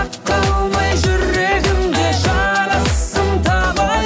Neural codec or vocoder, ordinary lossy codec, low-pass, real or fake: none; none; none; real